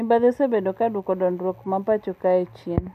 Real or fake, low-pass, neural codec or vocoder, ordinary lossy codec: real; 14.4 kHz; none; none